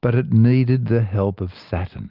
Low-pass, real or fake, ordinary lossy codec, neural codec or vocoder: 5.4 kHz; real; Opus, 32 kbps; none